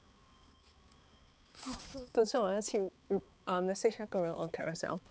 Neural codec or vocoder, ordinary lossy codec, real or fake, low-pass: codec, 16 kHz, 4 kbps, X-Codec, HuBERT features, trained on balanced general audio; none; fake; none